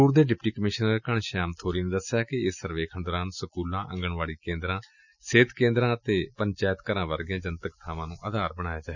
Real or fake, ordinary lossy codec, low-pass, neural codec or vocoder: real; none; 7.2 kHz; none